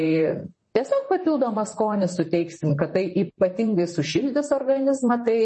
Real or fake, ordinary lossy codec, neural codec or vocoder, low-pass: fake; MP3, 32 kbps; vocoder, 44.1 kHz, 128 mel bands, Pupu-Vocoder; 10.8 kHz